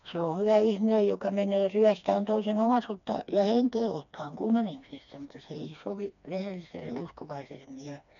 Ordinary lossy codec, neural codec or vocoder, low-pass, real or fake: none; codec, 16 kHz, 2 kbps, FreqCodec, smaller model; 7.2 kHz; fake